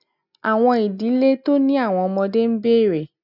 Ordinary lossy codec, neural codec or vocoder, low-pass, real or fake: none; none; 5.4 kHz; real